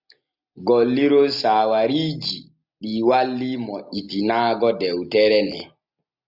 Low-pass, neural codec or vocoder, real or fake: 5.4 kHz; none; real